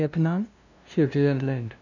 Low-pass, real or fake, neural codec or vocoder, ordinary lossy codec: 7.2 kHz; fake; codec, 16 kHz, 0.5 kbps, FunCodec, trained on LibriTTS, 25 frames a second; none